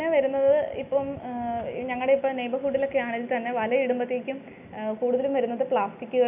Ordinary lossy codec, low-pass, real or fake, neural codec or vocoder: none; 3.6 kHz; real; none